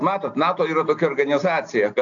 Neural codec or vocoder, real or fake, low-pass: none; real; 7.2 kHz